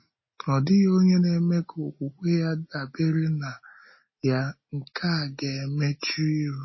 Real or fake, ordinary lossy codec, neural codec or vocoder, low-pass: real; MP3, 24 kbps; none; 7.2 kHz